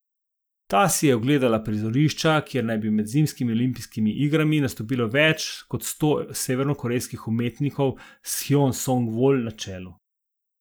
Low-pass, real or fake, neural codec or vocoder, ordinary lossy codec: none; real; none; none